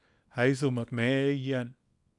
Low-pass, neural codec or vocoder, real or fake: 10.8 kHz; codec, 24 kHz, 0.9 kbps, WavTokenizer, small release; fake